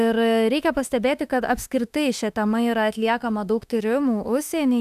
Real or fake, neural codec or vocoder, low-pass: fake; autoencoder, 48 kHz, 32 numbers a frame, DAC-VAE, trained on Japanese speech; 14.4 kHz